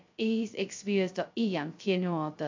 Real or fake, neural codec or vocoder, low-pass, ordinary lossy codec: fake; codec, 16 kHz, 0.2 kbps, FocalCodec; 7.2 kHz; MP3, 64 kbps